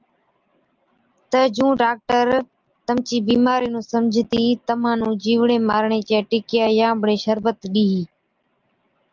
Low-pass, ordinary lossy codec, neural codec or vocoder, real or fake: 7.2 kHz; Opus, 24 kbps; none; real